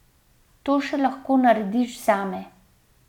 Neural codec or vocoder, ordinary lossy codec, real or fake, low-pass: none; none; real; 19.8 kHz